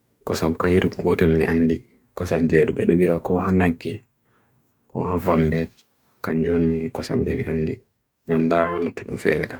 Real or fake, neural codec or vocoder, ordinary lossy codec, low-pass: fake; codec, 44.1 kHz, 2.6 kbps, DAC; none; none